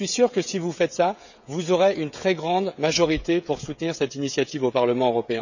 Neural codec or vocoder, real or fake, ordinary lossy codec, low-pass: codec, 16 kHz, 16 kbps, FreqCodec, smaller model; fake; none; 7.2 kHz